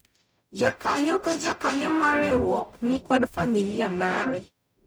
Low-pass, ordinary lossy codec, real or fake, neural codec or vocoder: none; none; fake; codec, 44.1 kHz, 0.9 kbps, DAC